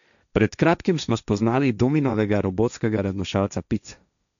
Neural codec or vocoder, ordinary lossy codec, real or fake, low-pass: codec, 16 kHz, 1.1 kbps, Voila-Tokenizer; none; fake; 7.2 kHz